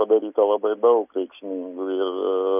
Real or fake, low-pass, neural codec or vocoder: real; 3.6 kHz; none